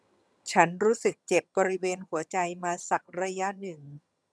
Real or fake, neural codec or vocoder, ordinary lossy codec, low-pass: fake; vocoder, 22.05 kHz, 80 mel bands, HiFi-GAN; none; none